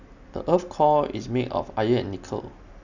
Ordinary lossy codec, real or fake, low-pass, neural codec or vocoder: none; real; 7.2 kHz; none